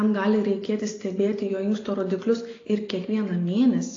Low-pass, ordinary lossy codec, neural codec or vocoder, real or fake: 7.2 kHz; AAC, 32 kbps; none; real